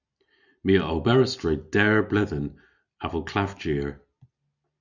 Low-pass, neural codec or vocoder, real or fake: 7.2 kHz; none; real